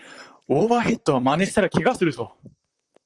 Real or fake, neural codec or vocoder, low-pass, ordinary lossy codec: fake; vocoder, 44.1 kHz, 128 mel bands, Pupu-Vocoder; 10.8 kHz; Opus, 32 kbps